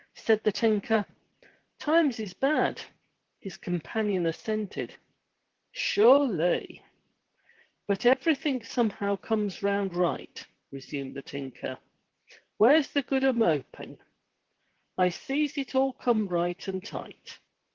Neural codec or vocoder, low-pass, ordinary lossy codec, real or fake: vocoder, 44.1 kHz, 128 mel bands, Pupu-Vocoder; 7.2 kHz; Opus, 16 kbps; fake